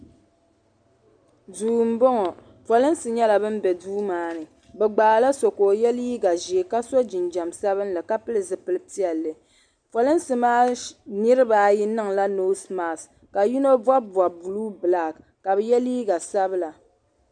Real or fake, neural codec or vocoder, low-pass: real; none; 9.9 kHz